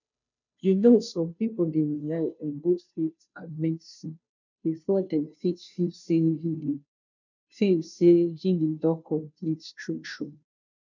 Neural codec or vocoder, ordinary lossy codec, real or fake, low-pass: codec, 16 kHz, 0.5 kbps, FunCodec, trained on Chinese and English, 25 frames a second; none; fake; 7.2 kHz